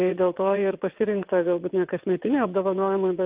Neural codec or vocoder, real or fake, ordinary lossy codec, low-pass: vocoder, 22.05 kHz, 80 mel bands, WaveNeXt; fake; Opus, 32 kbps; 3.6 kHz